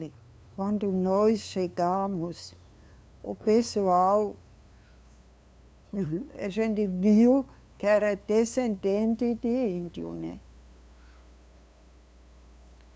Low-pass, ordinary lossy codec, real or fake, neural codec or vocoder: none; none; fake; codec, 16 kHz, 2 kbps, FunCodec, trained on LibriTTS, 25 frames a second